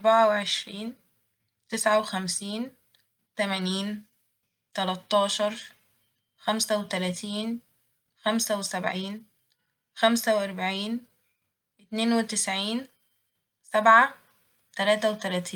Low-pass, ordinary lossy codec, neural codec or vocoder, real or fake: 19.8 kHz; Opus, 32 kbps; none; real